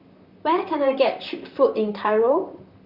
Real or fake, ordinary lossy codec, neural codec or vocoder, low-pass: fake; Opus, 64 kbps; vocoder, 44.1 kHz, 128 mel bands, Pupu-Vocoder; 5.4 kHz